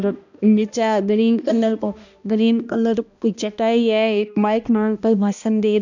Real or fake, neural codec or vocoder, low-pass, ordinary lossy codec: fake; codec, 16 kHz, 1 kbps, X-Codec, HuBERT features, trained on balanced general audio; 7.2 kHz; none